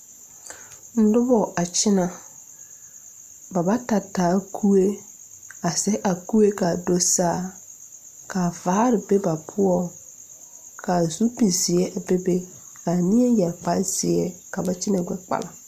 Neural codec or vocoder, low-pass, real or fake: none; 14.4 kHz; real